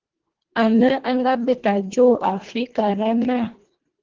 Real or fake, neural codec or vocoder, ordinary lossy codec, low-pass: fake; codec, 24 kHz, 1.5 kbps, HILCodec; Opus, 16 kbps; 7.2 kHz